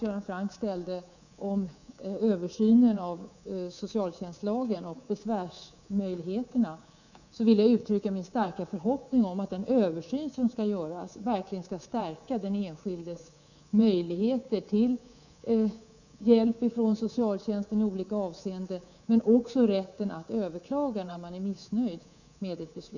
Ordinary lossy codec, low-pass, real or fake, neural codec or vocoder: none; 7.2 kHz; fake; codec, 24 kHz, 3.1 kbps, DualCodec